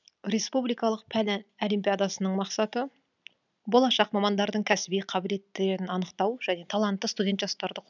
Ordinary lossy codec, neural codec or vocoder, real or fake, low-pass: none; none; real; 7.2 kHz